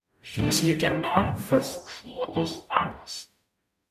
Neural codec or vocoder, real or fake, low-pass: codec, 44.1 kHz, 0.9 kbps, DAC; fake; 14.4 kHz